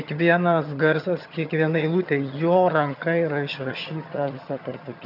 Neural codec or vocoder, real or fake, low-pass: vocoder, 22.05 kHz, 80 mel bands, HiFi-GAN; fake; 5.4 kHz